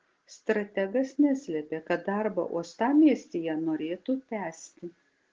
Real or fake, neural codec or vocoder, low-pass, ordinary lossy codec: real; none; 7.2 kHz; Opus, 16 kbps